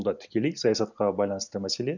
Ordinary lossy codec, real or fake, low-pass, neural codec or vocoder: none; real; 7.2 kHz; none